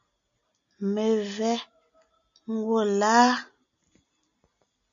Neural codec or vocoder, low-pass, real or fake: none; 7.2 kHz; real